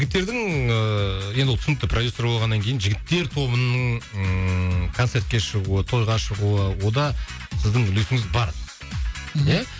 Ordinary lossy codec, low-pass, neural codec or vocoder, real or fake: none; none; none; real